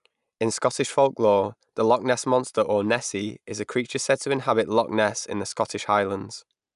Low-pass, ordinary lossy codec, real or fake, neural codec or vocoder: 10.8 kHz; none; real; none